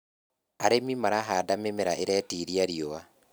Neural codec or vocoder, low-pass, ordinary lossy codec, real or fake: none; none; none; real